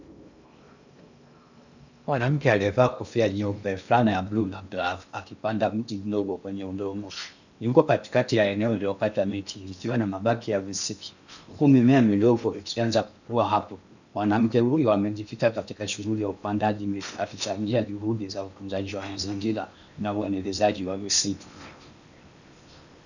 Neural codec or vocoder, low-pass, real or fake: codec, 16 kHz in and 24 kHz out, 0.8 kbps, FocalCodec, streaming, 65536 codes; 7.2 kHz; fake